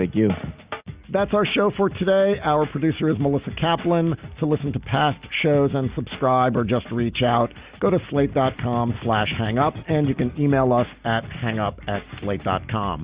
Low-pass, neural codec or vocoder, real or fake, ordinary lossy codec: 3.6 kHz; none; real; Opus, 64 kbps